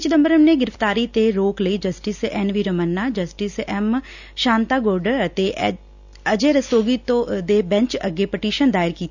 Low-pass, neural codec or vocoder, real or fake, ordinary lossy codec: 7.2 kHz; none; real; none